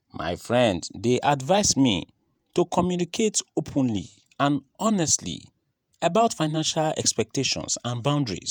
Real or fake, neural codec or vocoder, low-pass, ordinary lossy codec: fake; vocoder, 48 kHz, 128 mel bands, Vocos; none; none